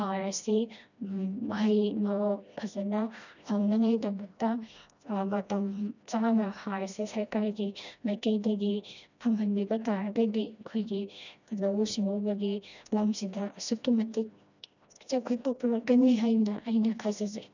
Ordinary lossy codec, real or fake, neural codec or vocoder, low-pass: none; fake; codec, 16 kHz, 1 kbps, FreqCodec, smaller model; 7.2 kHz